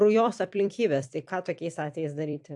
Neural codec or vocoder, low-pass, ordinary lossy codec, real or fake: vocoder, 44.1 kHz, 128 mel bands every 256 samples, BigVGAN v2; 10.8 kHz; AAC, 64 kbps; fake